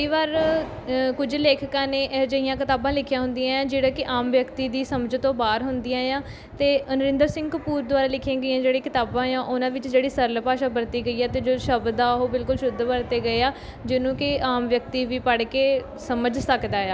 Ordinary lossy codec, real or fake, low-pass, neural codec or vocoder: none; real; none; none